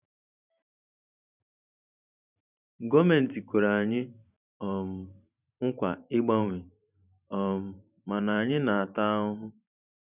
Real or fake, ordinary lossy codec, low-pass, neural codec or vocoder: fake; none; 3.6 kHz; vocoder, 44.1 kHz, 128 mel bands every 512 samples, BigVGAN v2